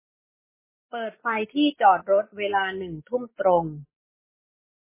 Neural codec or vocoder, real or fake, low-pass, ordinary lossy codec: none; real; 3.6 kHz; MP3, 16 kbps